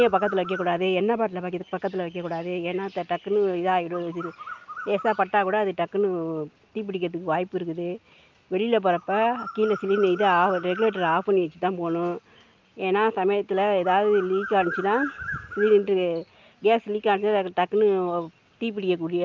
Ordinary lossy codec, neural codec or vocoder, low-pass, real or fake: Opus, 24 kbps; none; 7.2 kHz; real